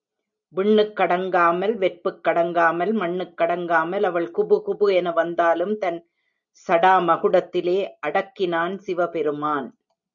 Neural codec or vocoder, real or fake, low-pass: none; real; 7.2 kHz